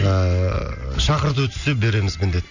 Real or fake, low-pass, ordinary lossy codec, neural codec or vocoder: real; 7.2 kHz; none; none